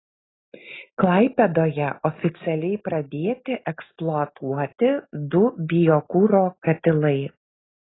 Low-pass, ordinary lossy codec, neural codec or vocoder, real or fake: 7.2 kHz; AAC, 16 kbps; none; real